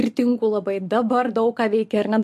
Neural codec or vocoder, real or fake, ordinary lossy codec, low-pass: none; real; MP3, 64 kbps; 14.4 kHz